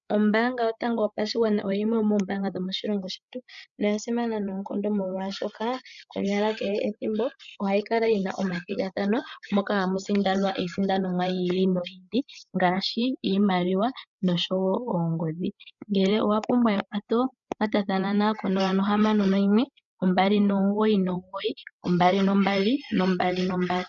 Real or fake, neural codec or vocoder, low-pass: fake; codec, 16 kHz, 8 kbps, FreqCodec, larger model; 7.2 kHz